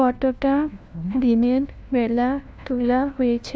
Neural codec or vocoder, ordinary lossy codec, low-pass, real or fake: codec, 16 kHz, 1 kbps, FunCodec, trained on LibriTTS, 50 frames a second; none; none; fake